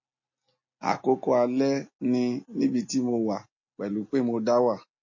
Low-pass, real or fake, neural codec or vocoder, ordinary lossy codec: 7.2 kHz; real; none; MP3, 32 kbps